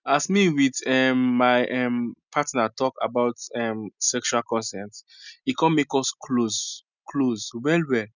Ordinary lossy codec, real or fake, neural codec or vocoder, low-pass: none; real; none; 7.2 kHz